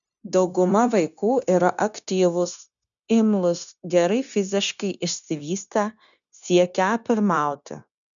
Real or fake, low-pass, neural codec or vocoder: fake; 7.2 kHz; codec, 16 kHz, 0.9 kbps, LongCat-Audio-Codec